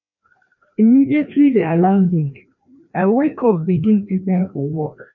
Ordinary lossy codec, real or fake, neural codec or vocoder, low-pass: none; fake; codec, 16 kHz, 1 kbps, FreqCodec, larger model; 7.2 kHz